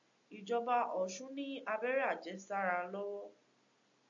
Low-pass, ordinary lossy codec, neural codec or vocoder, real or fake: 7.2 kHz; AAC, 64 kbps; none; real